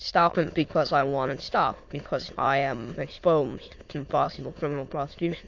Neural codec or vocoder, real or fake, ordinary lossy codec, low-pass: autoencoder, 22.05 kHz, a latent of 192 numbers a frame, VITS, trained on many speakers; fake; AAC, 48 kbps; 7.2 kHz